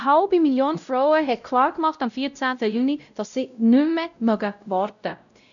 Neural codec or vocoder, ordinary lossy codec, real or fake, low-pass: codec, 16 kHz, 0.5 kbps, X-Codec, WavLM features, trained on Multilingual LibriSpeech; none; fake; 7.2 kHz